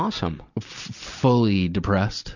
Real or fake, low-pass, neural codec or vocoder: real; 7.2 kHz; none